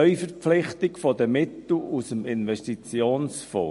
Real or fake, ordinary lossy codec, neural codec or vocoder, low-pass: real; MP3, 48 kbps; none; 14.4 kHz